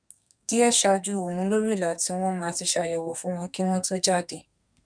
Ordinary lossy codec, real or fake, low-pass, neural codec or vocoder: none; fake; 9.9 kHz; codec, 32 kHz, 1.9 kbps, SNAC